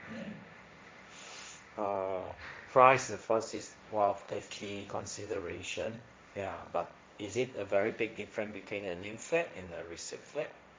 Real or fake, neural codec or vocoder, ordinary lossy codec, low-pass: fake; codec, 16 kHz, 1.1 kbps, Voila-Tokenizer; none; none